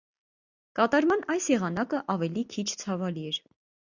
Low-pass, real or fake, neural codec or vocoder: 7.2 kHz; real; none